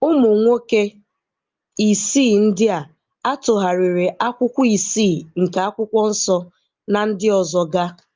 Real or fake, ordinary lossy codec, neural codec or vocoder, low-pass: real; Opus, 24 kbps; none; 7.2 kHz